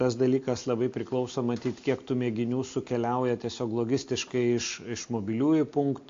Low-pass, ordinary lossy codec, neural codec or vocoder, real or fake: 7.2 kHz; AAC, 48 kbps; none; real